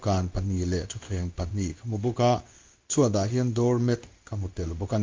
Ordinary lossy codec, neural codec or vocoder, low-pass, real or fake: Opus, 24 kbps; codec, 16 kHz in and 24 kHz out, 1 kbps, XY-Tokenizer; 7.2 kHz; fake